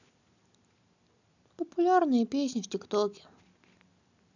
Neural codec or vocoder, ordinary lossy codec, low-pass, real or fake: none; none; 7.2 kHz; real